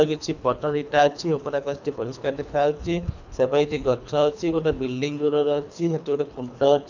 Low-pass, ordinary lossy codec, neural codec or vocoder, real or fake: 7.2 kHz; none; codec, 24 kHz, 3 kbps, HILCodec; fake